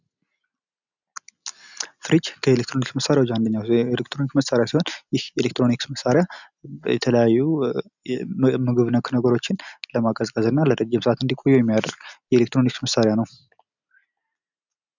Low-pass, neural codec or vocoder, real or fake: 7.2 kHz; none; real